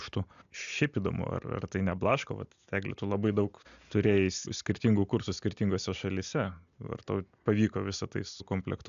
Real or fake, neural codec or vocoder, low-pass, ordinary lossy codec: real; none; 7.2 kHz; AAC, 96 kbps